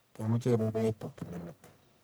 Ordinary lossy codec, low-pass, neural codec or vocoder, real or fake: none; none; codec, 44.1 kHz, 1.7 kbps, Pupu-Codec; fake